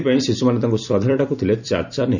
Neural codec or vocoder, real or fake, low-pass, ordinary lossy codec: vocoder, 44.1 kHz, 128 mel bands every 256 samples, BigVGAN v2; fake; 7.2 kHz; none